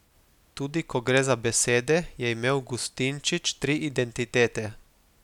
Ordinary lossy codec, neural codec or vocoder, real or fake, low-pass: none; none; real; 19.8 kHz